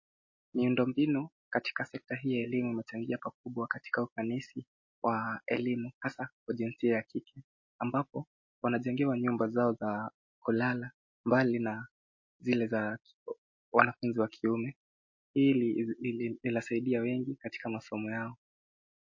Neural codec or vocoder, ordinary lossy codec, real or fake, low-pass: none; MP3, 32 kbps; real; 7.2 kHz